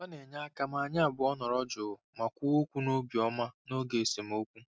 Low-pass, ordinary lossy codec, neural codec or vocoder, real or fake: none; none; none; real